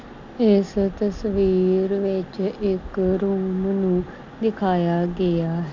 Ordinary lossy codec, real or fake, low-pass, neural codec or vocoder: MP3, 64 kbps; real; 7.2 kHz; none